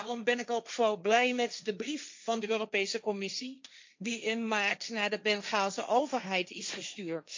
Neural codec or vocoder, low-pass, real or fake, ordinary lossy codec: codec, 16 kHz, 1.1 kbps, Voila-Tokenizer; 7.2 kHz; fake; MP3, 64 kbps